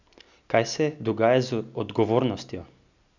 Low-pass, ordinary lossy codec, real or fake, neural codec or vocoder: 7.2 kHz; none; real; none